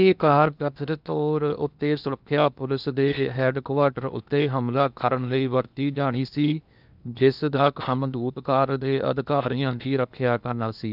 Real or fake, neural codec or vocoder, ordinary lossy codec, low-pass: fake; codec, 16 kHz in and 24 kHz out, 0.8 kbps, FocalCodec, streaming, 65536 codes; none; 5.4 kHz